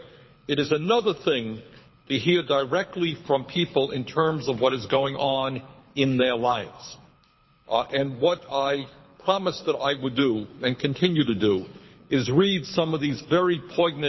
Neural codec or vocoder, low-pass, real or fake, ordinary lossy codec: codec, 24 kHz, 6 kbps, HILCodec; 7.2 kHz; fake; MP3, 24 kbps